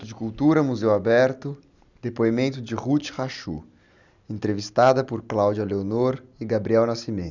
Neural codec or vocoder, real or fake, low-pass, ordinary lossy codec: none; real; 7.2 kHz; none